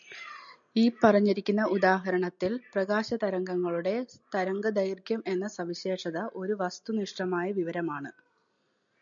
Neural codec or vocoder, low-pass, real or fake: none; 7.2 kHz; real